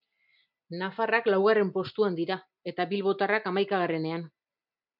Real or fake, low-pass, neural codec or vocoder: real; 5.4 kHz; none